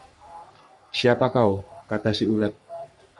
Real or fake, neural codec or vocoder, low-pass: fake; codec, 44.1 kHz, 3.4 kbps, Pupu-Codec; 10.8 kHz